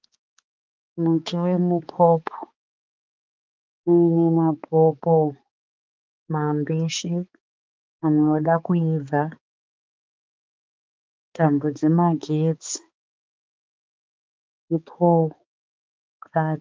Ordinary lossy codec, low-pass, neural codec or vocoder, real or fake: Opus, 24 kbps; 7.2 kHz; codec, 16 kHz, 4 kbps, X-Codec, HuBERT features, trained on balanced general audio; fake